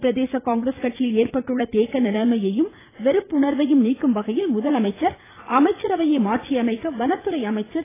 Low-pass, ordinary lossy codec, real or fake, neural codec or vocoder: 3.6 kHz; AAC, 16 kbps; fake; vocoder, 44.1 kHz, 80 mel bands, Vocos